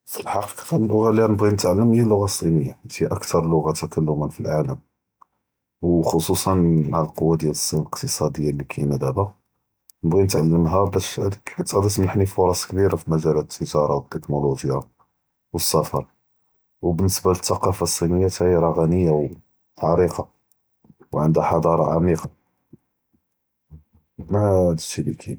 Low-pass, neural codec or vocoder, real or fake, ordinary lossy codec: none; none; real; none